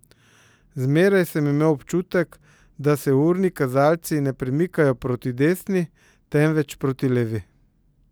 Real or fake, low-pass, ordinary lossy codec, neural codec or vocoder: real; none; none; none